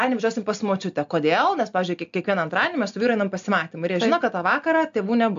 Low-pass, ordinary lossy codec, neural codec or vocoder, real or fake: 7.2 kHz; AAC, 64 kbps; none; real